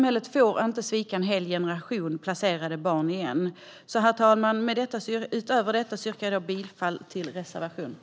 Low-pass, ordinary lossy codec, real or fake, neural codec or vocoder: none; none; real; none